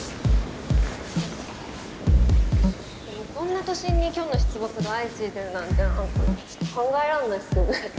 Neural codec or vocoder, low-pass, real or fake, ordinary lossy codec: none; none; real; none